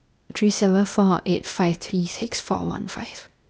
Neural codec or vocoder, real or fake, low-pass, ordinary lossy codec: codec, 16 kHz, 0.8 kbps, ZipCodec; fake; none; none